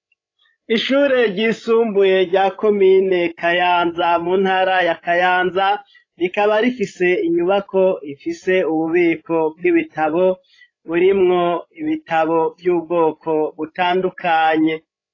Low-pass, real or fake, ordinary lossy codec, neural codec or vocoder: 7.2 kHz; fake; AAC, 32 kbps; codec, 16 kHz, 16 kbps, FreqCodec, larger model